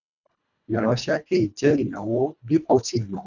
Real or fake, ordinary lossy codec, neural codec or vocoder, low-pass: fake; none; codec, 24 kHz, 1.5 kbps, HILCodec; 7.2 kHz